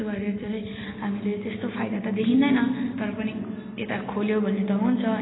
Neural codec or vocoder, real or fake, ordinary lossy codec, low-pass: none; real; AAC, 16 kbps; 7.2 kHz